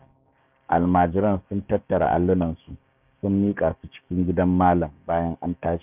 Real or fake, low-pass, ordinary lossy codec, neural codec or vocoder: fake; 3.6 kHz; none; codec, 16 kHz, 6 kbps, DAC